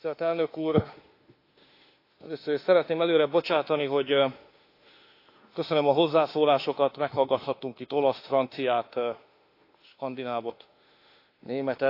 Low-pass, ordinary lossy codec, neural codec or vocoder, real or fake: 5.4 kHz; AAC, 32 kbps; autoencoder, 48 kHz, 32 numbers a frame, DAC-VAE, trained on Japanese speech; fake